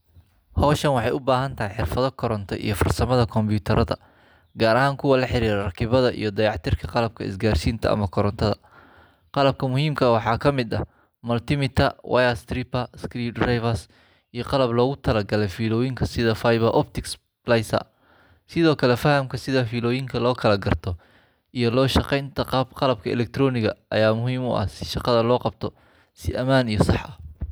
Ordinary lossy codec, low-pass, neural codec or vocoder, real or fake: none; none; none; real